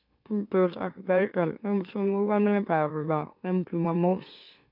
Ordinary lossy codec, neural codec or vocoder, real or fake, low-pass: none; autoencoder, 44.1 kHz, a latent of 192 numbers a frame, MeloTTS; fake; 5.4 kHz